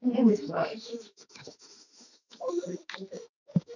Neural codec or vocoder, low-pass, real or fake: autoencoder, 48 kHz, 32 numbers a frame, DAC-VAE, trained on Japanese speech; 7.2 kHz; fake